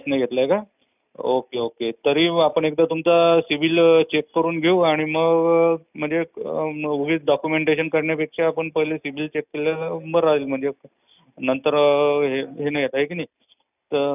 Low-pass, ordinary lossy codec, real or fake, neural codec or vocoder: 3.6 kHz; none; real; none